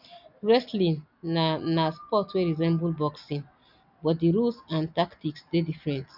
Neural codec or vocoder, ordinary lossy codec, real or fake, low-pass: none; none; real; 5.4 kHz